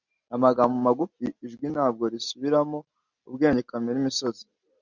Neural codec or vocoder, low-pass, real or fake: none; 7.2 kHz; real